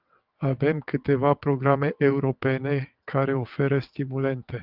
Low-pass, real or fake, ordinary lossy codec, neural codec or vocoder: 5.4 kHz; fake; Opus, 24 kbps; vocoder, 22.05 kHz, 80 mel bands, WaveNeXt